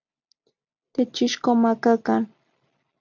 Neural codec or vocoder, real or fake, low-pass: none; real; 7.2 kHz